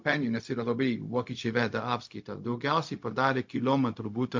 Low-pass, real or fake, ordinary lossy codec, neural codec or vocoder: 7.2 kHz; fake; MP3, 48 kbps; codec, 16 kHz, 0.4 kbps, LongCat-Audio-Codec